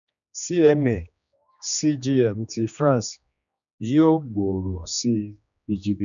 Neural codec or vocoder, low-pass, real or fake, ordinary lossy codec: codec, 16 kHz, 2 kbps, X-Codec, HuBERT features, trained on general audio; 7.2 kHz; fake; none